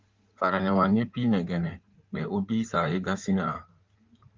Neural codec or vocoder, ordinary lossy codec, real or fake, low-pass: codec, 16 kHz in and 24 kHz out, 2.2 kbps, FireRedTTS-2 codec; Opus, 32 kbps; fake; 7.2 kHz